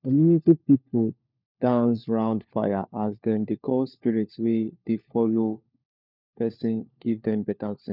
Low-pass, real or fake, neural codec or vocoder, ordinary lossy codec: 5.4 kHz; fake; codec, 16 kHz, 4 kbps, FunCodec, trained on LibriTTS, 50 frames a second; none